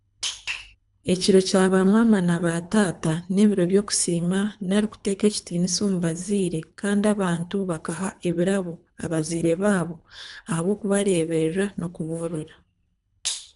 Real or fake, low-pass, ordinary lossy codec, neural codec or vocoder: fake; 10.8 kHz; none; codec, 24 kHz, 3 kbps, HILCodec